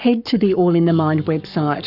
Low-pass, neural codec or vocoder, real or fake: 5.4 kHz; codec, 44.1 kHz, 7.8 kbps, Pupu-Codec; fake